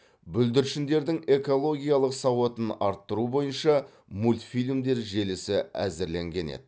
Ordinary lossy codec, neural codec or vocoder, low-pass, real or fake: none; none; none; real